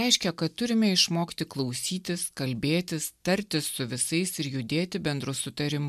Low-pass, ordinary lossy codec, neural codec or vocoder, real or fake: 14.4 kHz; MP3, 96 kbps; none; real